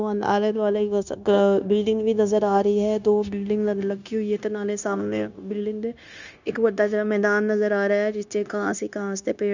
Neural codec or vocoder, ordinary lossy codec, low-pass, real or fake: codec, 16 kHz, 0.9 kbps, LongCat-Audio-Codec; none; 7.2 kHz; fake